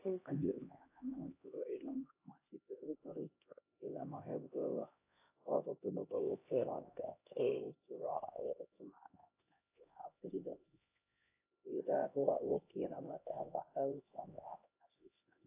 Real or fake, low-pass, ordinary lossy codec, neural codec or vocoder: fake; 3.6 kHz; AAC, 24 kbps; codec, 16 kHz, 1 kbps, X-Codec, HuBERT features, trained on LibriSpeech